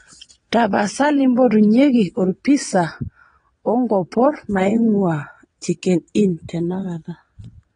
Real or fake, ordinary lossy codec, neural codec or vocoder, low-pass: fake; AAC, 32 kbps; vocoder, 22.05 kHz, 80 mel bands, WaveNeXt; 9.9 kHz